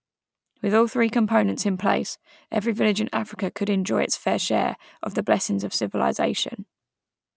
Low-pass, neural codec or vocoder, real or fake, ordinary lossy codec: none; none; real; none